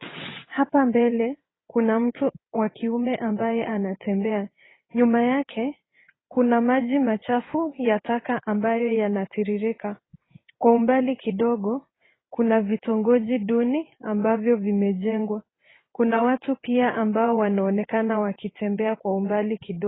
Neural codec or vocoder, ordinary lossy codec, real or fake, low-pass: vocoder, 22.05 kHz, 80 mel bands, WaveNeXt; AAC, 16 kbps; fake; 7.2 kHz